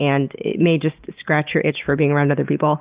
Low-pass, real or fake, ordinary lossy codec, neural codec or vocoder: 3.6 kHz; real; Opus, 32 kbps; none